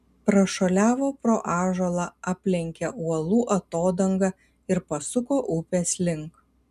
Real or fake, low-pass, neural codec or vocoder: real; 14.4 kHz; none